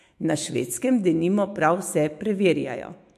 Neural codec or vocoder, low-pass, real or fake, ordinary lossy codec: autoencoder, 48 kHz, 128 numbers a frame, DAC-VAE, trained on Japanese speech; 14.4 kHz; fake; MP3, 64 kbps